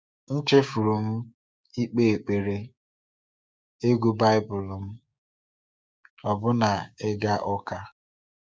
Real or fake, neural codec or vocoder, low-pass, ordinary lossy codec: fake; codec, 16 kHz, 6 kbps, DAC; none; none